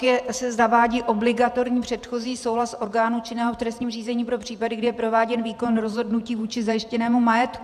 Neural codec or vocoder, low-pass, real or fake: vocoder, 48 kHz, 128 mel bands, Vocos; 14.4 kHz; fake